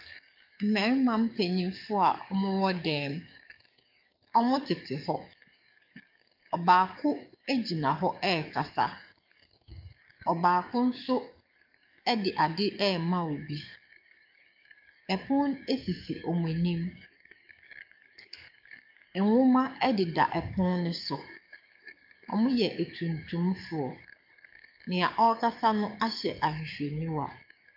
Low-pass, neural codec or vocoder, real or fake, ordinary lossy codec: 5.4 kHz; codec, 44.1 kHz, 7.8 kbps, DAC; fake; MP3, 48 kbps